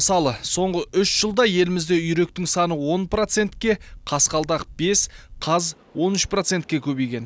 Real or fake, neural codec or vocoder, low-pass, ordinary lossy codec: real; none; none; none